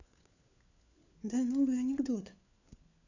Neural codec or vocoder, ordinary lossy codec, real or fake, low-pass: codec, 16 kHz, 4 kbps, FunCodec, trained on LibriTTS, 50 frames a second; none; fake; 7.2 kHz